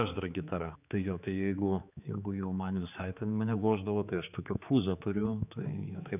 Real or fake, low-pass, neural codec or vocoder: fake; 3.6 kHz; codec, 16 kHz, 4 kbps, X-Codec, HuBERT features, trained on general audio